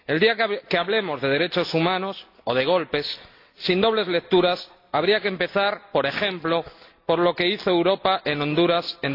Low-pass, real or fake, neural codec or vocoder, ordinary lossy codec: 5.4 kHz; real; none; AAC, 32 kbps